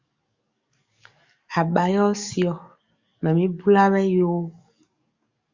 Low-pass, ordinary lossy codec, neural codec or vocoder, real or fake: 7.2 kHz; Opus, 64 kbps; codec, 44.1 kHz, 7.8 kbps, DAC; fake